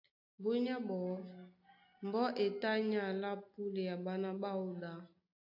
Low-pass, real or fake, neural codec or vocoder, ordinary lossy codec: 5.4 kHz; real; none; AAC, 48 kbps